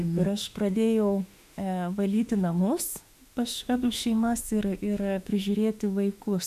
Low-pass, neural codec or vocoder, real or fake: 14.4 kHz; autoencoder, 48 kHz, 32 numbers a frame, DAC-VAE, trained on Japanese speech; fake